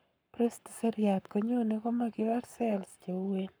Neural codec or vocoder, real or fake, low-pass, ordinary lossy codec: codec, 44.1 kHz, 7.8 kbps, Pupu-Codec; fake; none; none